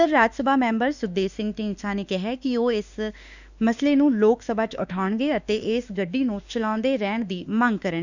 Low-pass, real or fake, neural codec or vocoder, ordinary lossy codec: 7.2 kHz; fake; autoencoder, 48 kHz, 32 numbers a frame, DAC-VAE, trained on Japanese speech; none